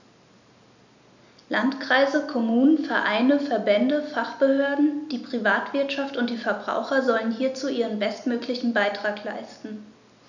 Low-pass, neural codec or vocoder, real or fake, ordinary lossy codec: 7.2 kHz; none; real; none